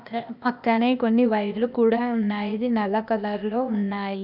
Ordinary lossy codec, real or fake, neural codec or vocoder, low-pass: none; fake; codec, 16 kHz, 0.8 kbps, ZipCodec; 5.4 kHz